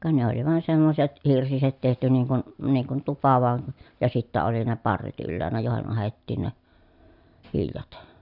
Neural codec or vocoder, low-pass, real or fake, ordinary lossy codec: none; 5.4 kHz; real; none